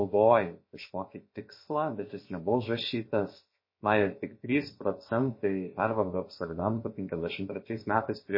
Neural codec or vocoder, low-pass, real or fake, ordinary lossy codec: codec, 16 kHz, about 1 kbps, DyCAST, with the encoder's durations; 5.4 kHz; fake; MP3, 24 kbps